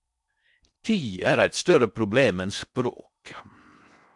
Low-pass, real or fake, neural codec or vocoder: 10.8 kHz; fake; codec, 16 kHz in and 24 kHz out, 0.6 kbps, FocalCodec, streaming, 4096 codes